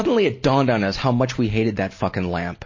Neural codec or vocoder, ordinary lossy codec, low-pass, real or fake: none; MP3, 32 kbps; 7.2 kHz; real